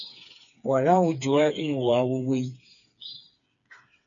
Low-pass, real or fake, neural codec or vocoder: 7.2 kHz; fake; codec, 16 kHz, 4 kbps, FreqCodec, smaller model